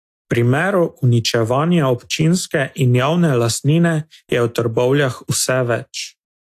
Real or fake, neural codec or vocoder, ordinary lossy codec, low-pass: real; none; AAC, 64 kbps; 14.4 kHz